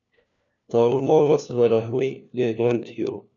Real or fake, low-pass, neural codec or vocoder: fake; 7.2 kHz; codec, 16 kHz, 1 kbps, FunCodec, trained on LibriTTS, 50 frames a second